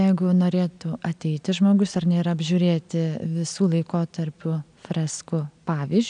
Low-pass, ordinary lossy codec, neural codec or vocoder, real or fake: 9.9 kHz; MP3, 96 kbps; none; real